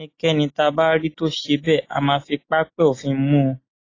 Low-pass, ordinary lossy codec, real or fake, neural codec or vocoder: 7.2 kHz; AAC, 32 kbps; real; none